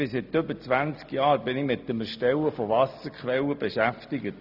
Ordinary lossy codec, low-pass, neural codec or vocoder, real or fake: none; 5.4 kHz; none; real